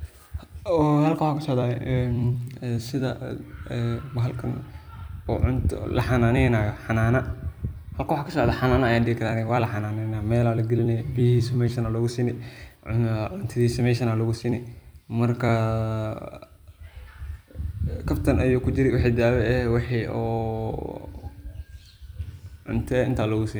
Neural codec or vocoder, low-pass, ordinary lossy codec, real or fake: vocoder, 44.1 kHz, 128 mel bands every 256 samples, BigVGAN v2; none; none; fake